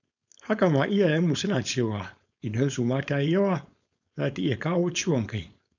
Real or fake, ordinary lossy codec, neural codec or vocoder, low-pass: fake; none; codec, 16 kHz, 4.8 kbps, FACodec; 7.2 kHz